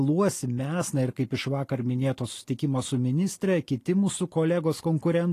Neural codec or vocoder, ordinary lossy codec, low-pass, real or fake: none; AAC, 48 kbps; 14.4 kHz; real